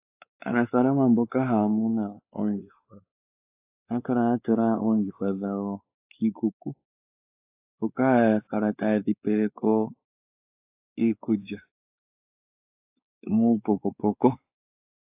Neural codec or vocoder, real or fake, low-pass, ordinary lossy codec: codec, 16 kHz, 4 kbps, X-Codec, WavLM features, trained on Multilingual LibriSpeech; fake; 3.6 kHz; AAC, 32 kbps